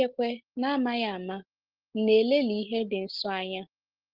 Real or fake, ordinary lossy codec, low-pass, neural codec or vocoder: real; Opus, 16 kbps; 5.4 kHz; none